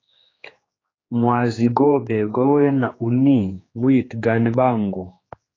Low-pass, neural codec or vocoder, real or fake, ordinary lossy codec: 7.2 kHz; codec, 16 kHz, 2 kbps, X-Codec, HuBERT features, trained on general audio; fake; AAC, 32 kbps